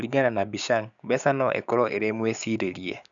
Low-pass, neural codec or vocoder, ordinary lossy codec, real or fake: 7.2 kHz; codec, 16 kHz, 6 kbps, DAC; none; fake